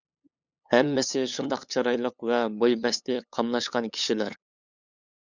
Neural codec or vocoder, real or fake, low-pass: codec, 16 kHz, 8 kbps, FunCodec, trained on LibriTTS, 25 frames a second; fake; 7.2 kHz